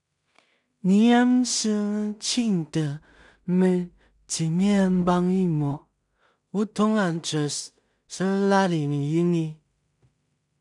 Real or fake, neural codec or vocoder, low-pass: fake; codec, 16 kHz in and 24 kHz out, 0.4 kbps, LongCat-Audio-Codec, two codebook decoder; 10.8 kHz